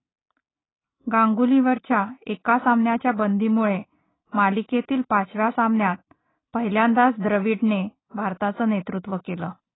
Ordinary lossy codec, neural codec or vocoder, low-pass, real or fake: AAC, 16 kbps; none; 7.2 kHz; real